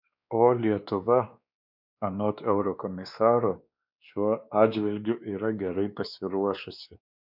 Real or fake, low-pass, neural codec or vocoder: fake; 5.4 kHz; codec, 16 kHz, 2 kbps, X-Codec, WavLM features, trained on Multilingual LibriSpeech